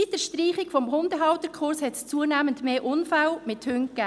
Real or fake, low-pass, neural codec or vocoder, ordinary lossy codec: real; none; none; none